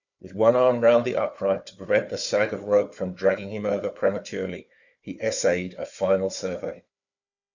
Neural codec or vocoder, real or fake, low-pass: codec, 16 kHz, 4 kbps, FunCodec, trained on Chinese and English, 50 frames a second; fake; 7.2 kHz